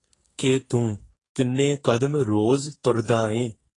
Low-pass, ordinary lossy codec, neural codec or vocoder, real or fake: 10.8 kHz; AAC, 32 kbps; codec, 44.1 kHz, 2.6 kbps, SNAC; fake